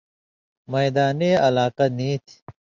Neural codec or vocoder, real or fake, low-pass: none; real; 7.2 kHz